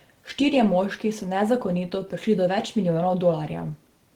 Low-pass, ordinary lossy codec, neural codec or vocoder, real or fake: 19.8 kHz; Opus, 16 kbps; none; real